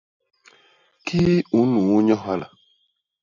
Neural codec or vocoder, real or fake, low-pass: none; real; 7.2 kHz